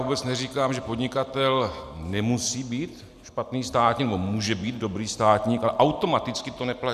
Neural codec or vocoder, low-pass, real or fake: none; 14.4 kHz; real